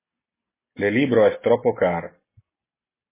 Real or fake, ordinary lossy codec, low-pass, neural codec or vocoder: real; MP3, 16 kbps; 3.6 kHz; none